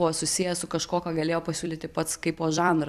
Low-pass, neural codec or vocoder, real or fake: 14.4 kHz; vocoder, 44.1 kHz, 128 mel bands every 256 samples, BigVGAN v2; fake